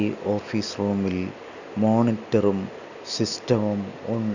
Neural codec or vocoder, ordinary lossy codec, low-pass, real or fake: none; none; 7.2 kHz; real